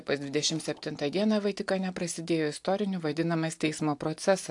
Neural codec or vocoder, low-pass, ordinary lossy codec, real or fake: none; 10.8 kHz; AAC, 64 kbps; real